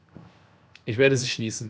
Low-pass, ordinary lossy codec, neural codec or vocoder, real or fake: none; none; codec, 16 kHz, 0.7 kbps, FocalCodec; fake